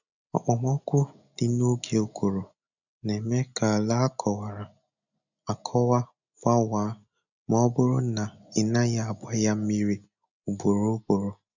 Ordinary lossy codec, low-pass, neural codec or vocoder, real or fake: none; 7.2 kHz; none; real